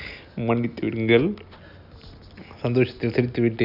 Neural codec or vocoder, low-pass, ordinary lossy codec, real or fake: none; 5.4 kHz; none; real